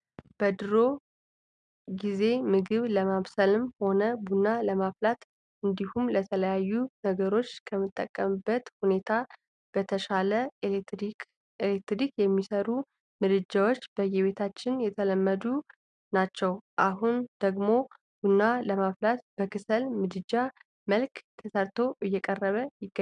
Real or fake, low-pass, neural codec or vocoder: real; 9.9 kHz; none